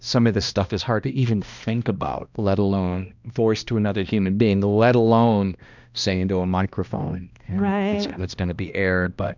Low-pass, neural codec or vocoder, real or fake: 7.2 kHz; codec, 16 kHz, 1 kbps, X-Codec, HuBERT features, trained on balanced general audio; fake